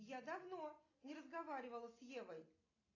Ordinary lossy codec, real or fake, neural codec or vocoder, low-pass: AAC, 32 kbps; real; none; 7.2 kHz